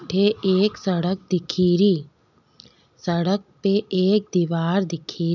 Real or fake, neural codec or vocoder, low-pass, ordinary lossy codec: real; none; 7.2 kHz; none